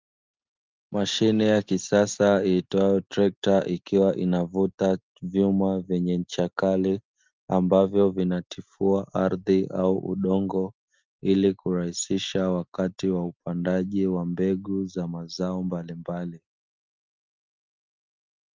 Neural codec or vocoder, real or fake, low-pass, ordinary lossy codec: none; real; 7.2 kHz; Opus, 24 kbps